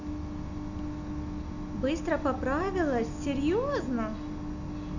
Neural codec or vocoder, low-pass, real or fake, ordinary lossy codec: none; 7.2 kHz; real; none